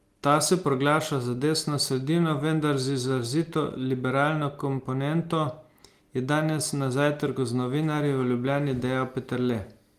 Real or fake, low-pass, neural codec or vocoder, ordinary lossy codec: real; 14.4 kHz; none; Opus, 24 kbps